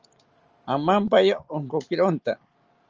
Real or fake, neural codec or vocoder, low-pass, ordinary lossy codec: real; none; 7.2 kHz; Opus, 24 kbps